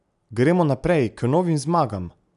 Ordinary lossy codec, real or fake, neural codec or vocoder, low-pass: none; real; none; 10.8 kHz